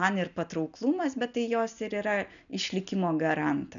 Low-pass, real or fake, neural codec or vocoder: 7.2 kHz; real; none